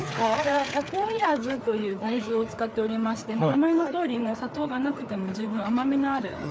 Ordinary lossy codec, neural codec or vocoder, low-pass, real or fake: none; codec, 16 kHz, 4 kbps, FreqCodec, larger model; none; fake